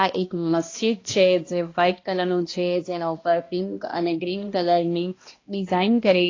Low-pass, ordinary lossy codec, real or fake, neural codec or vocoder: 7.2 kHz; AAC, 32 kbps; fake; codec, 16 kHz, 1 kbps, X-Codec, HuBERT features, trained on balanced general audio